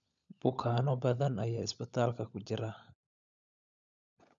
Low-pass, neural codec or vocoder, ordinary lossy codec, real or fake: 7.2 kHz; codec, 16 kHz, 16 kbps, FunCodec, trained on LibriTTS, 50 frames a second; none; fake